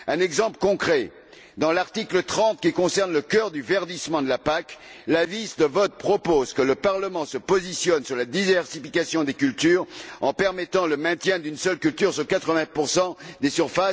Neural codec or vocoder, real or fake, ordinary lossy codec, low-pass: none; real; none; none